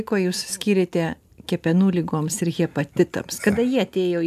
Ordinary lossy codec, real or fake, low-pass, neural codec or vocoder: AAC, 96 kbps; real; 14.4 kHz; none